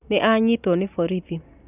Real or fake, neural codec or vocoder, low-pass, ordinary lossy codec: real; none; 3.6 kHz; none